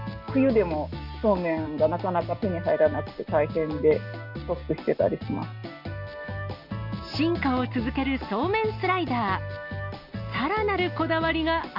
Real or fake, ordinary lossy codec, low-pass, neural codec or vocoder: real; none; 5.4 kHz; none